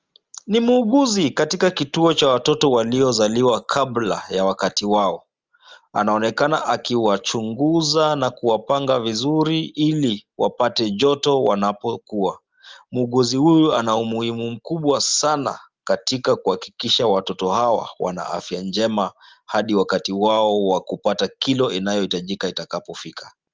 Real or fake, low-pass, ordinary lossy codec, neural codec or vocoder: real; 7.2 kHz; Opus, 24 kbps; none